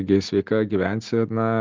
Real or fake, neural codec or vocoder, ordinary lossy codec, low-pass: real; none; Opus, 32 kbps; 7.2 kHz